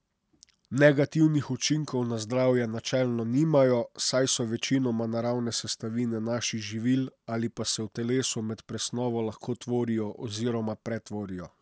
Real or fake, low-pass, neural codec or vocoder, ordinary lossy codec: real; none; none; none